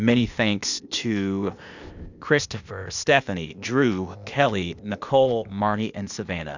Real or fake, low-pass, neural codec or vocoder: fake; 7.2 kHz; codec, 16 kHz, 0.8 kbps, ZipCodec